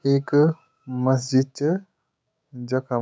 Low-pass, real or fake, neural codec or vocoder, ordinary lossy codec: none; fake; codec, 16 kHz, 6 kbps, DAC; none